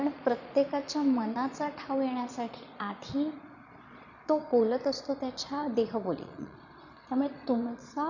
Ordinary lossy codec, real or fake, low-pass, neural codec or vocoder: none; fake; 7.2 kHz; vocoder, 22.05 kHz, 80 mel bands, Vocos